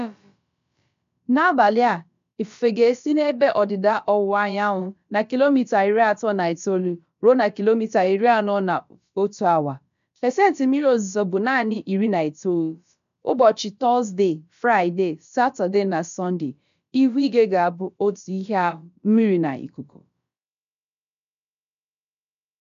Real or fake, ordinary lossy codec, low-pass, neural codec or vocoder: fake; MP3, 64 kbps; 7.2 kHz; codec, 16 kHz, about 1 kbps, DyCAST, with the encoder's durations